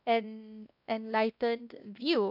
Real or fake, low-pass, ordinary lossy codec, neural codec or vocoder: fake; 5.4 kHz; none; codec, 16 kHz, 1 kbps, X-Codec, WavLM features, trained on Multilingual LibriSpeech